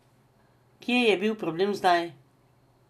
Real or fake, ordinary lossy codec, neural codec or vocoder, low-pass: real; none; none; 14.4 kHz